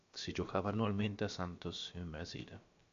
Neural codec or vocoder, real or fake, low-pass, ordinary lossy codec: codec, 16 kHz, about 1 kbps, DyCAST, with the encoder's durations; fake; 7.2 kHz; MP3, 48 kbps